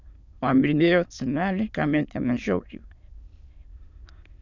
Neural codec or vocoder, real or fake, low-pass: autoencoder, 22.05 kHz, a latent of 192 numbers a frame, VITS, trained on many speakers; fake; 7.2 kHz